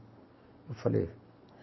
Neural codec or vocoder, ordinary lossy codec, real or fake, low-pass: none; MP3, 24 kbps; real; 7.2 kHz